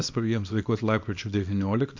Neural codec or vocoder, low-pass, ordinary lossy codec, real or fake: codec, 24 kHz, 0.9 kbps, WavTokenizer, small release; 7.2 kHz; MP3, 48 kbps; fake